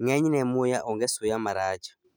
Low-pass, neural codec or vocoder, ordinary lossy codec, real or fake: none; none; none; real